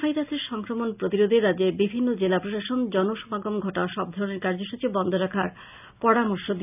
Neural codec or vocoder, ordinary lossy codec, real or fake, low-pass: none; none; real; 3.6 kHz